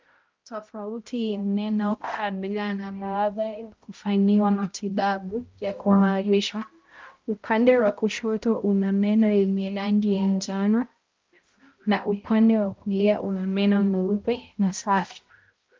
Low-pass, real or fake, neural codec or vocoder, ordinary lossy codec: 7.2 kHz; fake; codec, 16 kHz, 0.5 kbps, X-Codec, HuBERT features, trained on balanced general audio; Opus, 32 kbps